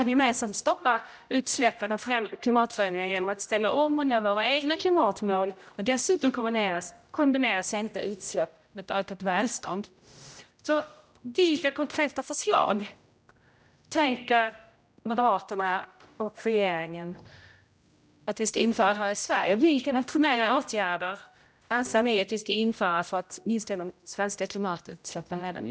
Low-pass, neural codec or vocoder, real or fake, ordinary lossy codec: none; codec, 16 kHz, 0.5 kbps, X-Codec, HuBERT features, trained on general audio; fake; none